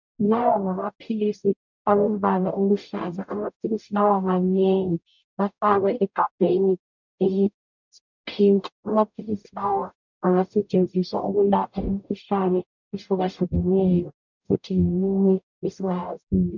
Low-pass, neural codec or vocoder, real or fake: 7.2 kHz; codec, 44.1 kHz, 0.9 kbps, DAC; fake